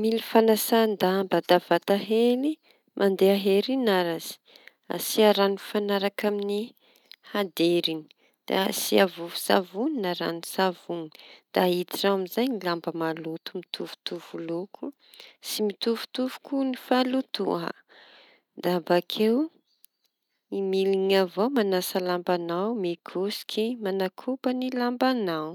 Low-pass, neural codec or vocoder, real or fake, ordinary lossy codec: 19.8 kHz; none; real; none